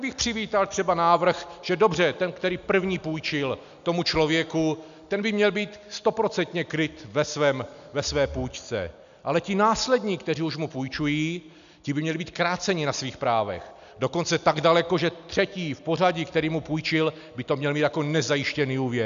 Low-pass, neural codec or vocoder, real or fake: 7.2 kHz; none; real